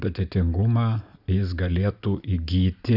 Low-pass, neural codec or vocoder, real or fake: 5.4 kHz; none; real